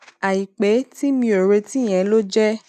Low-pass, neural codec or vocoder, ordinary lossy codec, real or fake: 14.4 kHz; none; none; real